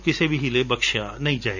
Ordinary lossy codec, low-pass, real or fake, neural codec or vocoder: none; 7.2 kHz; real; none